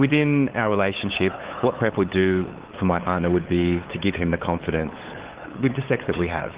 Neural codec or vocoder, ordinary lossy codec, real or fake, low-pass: codec, 16 kHz, 8 kbps, FunCodec, trained on LibriTTS, 25 frames a second; Opus, 16 kbps; fake; 3.6 kHz